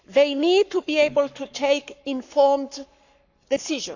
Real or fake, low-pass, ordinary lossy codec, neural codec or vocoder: fake; 7.2 kHz; none; codec, 44.1 kHz, 7.8 kbps, Pupu-Codec